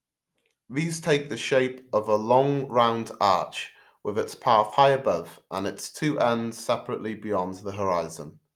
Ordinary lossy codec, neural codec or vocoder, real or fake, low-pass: Opus, 32 kbps; none; real; 19.8 kHz